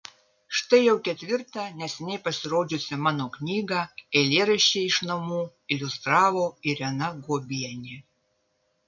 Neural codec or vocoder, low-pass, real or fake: none; 7.2 kHz; real